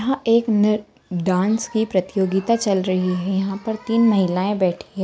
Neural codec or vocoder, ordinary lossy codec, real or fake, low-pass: none; none; real; none